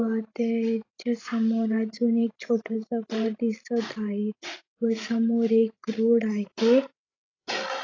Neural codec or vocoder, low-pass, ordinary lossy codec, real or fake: codec, 16 kHz, 16 kbps, FreqCodec, larger model; 7.2 kHz; AAC, 32 kbps; fake